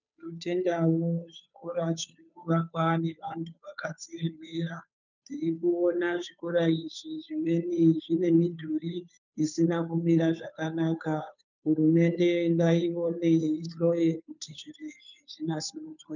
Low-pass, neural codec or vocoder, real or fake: 7.2 kHz; codec, 16 kHz, 2 kbps, FunCodec, trained on Chinese and English, 25 frames a second; fake